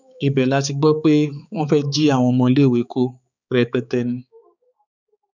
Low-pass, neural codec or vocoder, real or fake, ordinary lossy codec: 7.2 kHz; codec, 16 kHz, 4 kbps, X-Codec, HuBERT features, trained on balanced general audio; fake; none